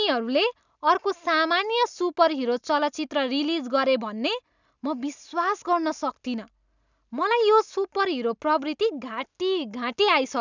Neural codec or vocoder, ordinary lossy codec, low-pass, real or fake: none; none; 7.2 kHz; real